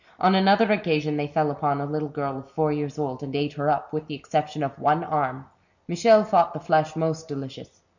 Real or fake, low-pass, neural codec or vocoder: real; 7.2 kHz; none